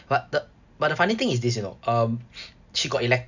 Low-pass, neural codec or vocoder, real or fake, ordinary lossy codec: 7.2 kHz; none; real; none